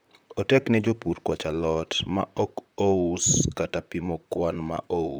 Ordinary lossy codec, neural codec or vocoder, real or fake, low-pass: none; vocoder, 44.1 kHz, 128 mel bands, Pupu-Vocoder; fake; none